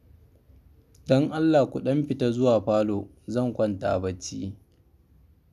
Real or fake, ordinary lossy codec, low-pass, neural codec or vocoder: real; none; 14.4 kHz; none